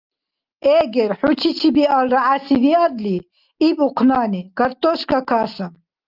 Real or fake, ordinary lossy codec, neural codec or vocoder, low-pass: real; Opus, 24 kbps; none; 5.4 kHz